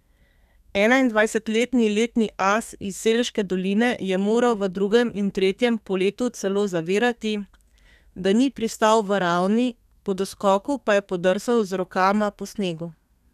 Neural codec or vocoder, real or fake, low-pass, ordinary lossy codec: codec, 32 kHz, 1.9 kbps, SNAC; fake; 14.4 kHz; none